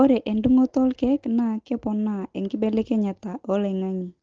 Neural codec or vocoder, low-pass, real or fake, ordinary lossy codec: none; 7.2 kHz; real; Opus, 16 kbps